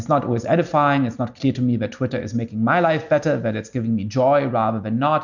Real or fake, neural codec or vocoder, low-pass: real; none; 7.2 kHz